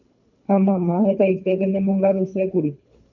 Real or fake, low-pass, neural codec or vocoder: fake; 7.2 kHz; codec, 24 kHz, 3 kbps, HILCodec